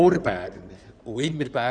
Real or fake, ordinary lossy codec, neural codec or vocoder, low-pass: fake; none; codec, 16 kHz in and 24 kHz out, 2.2 kbps, FireRedTTS-2 codec; 9.9 kHz